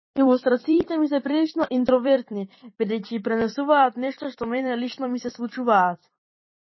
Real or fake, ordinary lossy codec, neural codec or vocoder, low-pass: fake; MP3, 24 kbps; vocoder, 44.1 kHz, 128 mel bands every 256 samples, BigVGAN v2; 7.2 kHz